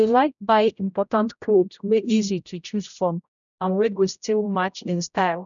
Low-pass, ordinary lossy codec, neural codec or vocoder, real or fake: 7.2 kHz; none; codec, 16 kHz, 0.5 kbps, X-Codec, HuBERT features, trained on general audio; fake